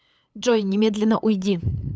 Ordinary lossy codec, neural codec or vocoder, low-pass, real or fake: none; codec, 16 kHz, 8 kbps, FunCodec, trained on LibriTTS, 25 frames a second; none; fake